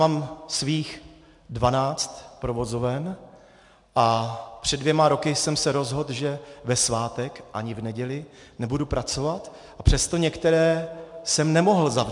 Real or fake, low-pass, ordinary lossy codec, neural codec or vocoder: real; 10.8 kHz; MP3, 64 kbps; none